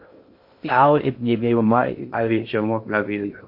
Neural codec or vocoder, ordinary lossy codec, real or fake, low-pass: codec, 16 kHz in and 24 kHz out, 0.6 kbps, FocalCodec, streaming, 2048 codes; MP3, 32 kbps; fake; 5.4 kHz